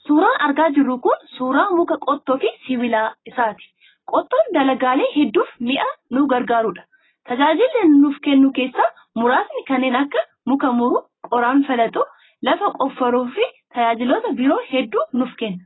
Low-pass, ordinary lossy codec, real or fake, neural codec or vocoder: 7.2 kHz; AAC, 16 kbps; real; none